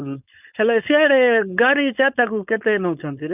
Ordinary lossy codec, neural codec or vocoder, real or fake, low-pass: none; codec, 16 kHz, 4.8 kbps, FACodec; fake; 3.6 kHz